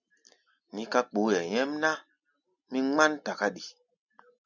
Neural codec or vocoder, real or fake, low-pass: none; real; 7.2 kHz